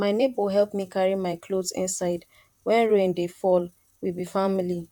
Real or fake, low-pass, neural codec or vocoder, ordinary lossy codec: fake; 19.8 kHz; vocoder, 44.1 kHz, 128 mel bands, Pupu-Vocoder; none